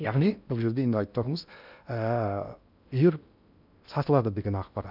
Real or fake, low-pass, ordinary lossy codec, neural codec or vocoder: fake; 5.4 kHz; none; codec, 16 kHz in and 24 kHz out, 0.6 kbps, FocalCodec, streaming, 2048 codes